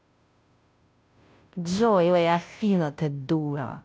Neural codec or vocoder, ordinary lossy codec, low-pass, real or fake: codec, 16 kHz, 0.5 kbps, FunCodec, trained on Chinese and English, 25 frames a second; none; none; fake